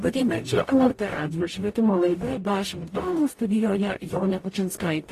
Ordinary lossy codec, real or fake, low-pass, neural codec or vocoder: AAC, 48 kbps; fake; 14.4 kHz; codec, 44.1 kHz, 0.9 kbps, DAC